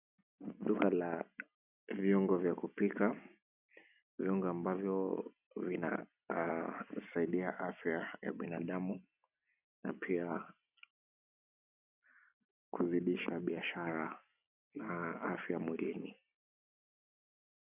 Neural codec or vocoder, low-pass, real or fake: none; 3.6 kHz; real